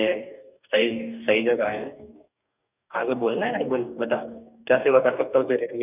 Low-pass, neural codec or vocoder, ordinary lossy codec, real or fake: 3.6 kHz; codec, 44.1 kHz, 2.6 kbps, DAC; none; fake